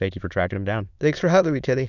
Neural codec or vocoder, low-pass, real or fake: autoencoder, 22.05 kHz, a latent of 192 numbers a frame, VITS, trained on many speakers; 7.2 kHz; fake